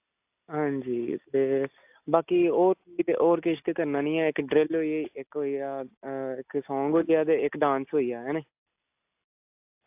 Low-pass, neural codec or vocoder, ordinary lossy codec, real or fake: 3.6 kHz; none; AAC, 32 kbps; real